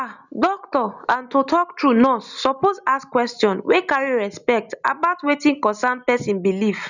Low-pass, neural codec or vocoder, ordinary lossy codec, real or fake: 7.2 kHz; none; none; real